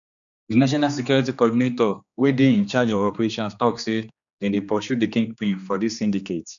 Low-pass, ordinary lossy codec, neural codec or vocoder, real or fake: 7.2 kHz; none; codec, 16 kHz, 2 kbps, X-Codec, HuBERT features, trained on general audio; fake